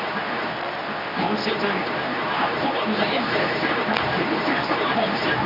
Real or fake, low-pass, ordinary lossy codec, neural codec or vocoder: fake; 5.4 kHz; none; codec, 24 kHz, 0.9 kbps, WavTokenizer, medium speech release version 2